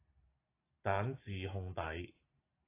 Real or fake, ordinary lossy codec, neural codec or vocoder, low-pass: real; AAC, 16 kbps; none; 3.6 kHz